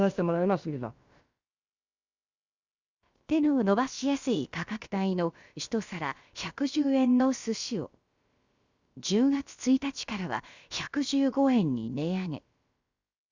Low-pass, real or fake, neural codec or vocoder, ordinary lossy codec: 7.2 kHz; fake; codec, 16 kHz, about 1 kbps, DyCAST, with the encoder's durations; Opus, 64 kbps